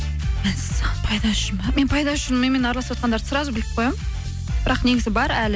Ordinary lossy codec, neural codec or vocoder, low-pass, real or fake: none; none; none; real